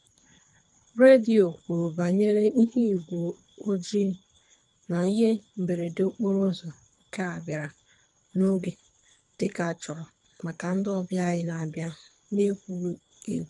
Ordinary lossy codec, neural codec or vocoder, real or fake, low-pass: none; codec, 24 kHz, 3 kbps, HILCodec; fake; 10.8 kHz